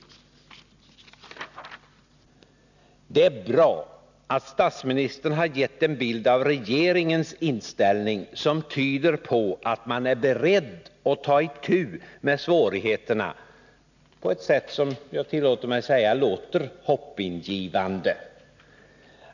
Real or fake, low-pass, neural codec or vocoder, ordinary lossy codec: real; 7.2 kHz; none; none